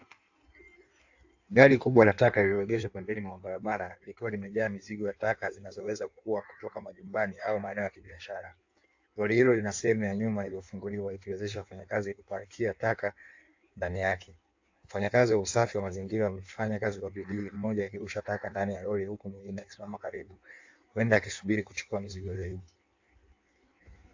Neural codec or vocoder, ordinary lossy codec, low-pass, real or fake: codec, 16 kHz in and 24 kHz out, 1.1 kbps, FireRedTTS-2 codec; AAC, 48 kbps; 7.2 kHz; fake